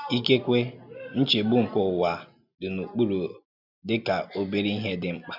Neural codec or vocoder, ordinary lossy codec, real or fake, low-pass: none; none; real; 5.4 kHz